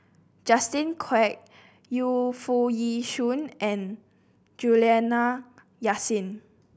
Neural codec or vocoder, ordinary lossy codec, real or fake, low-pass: none; none; real; none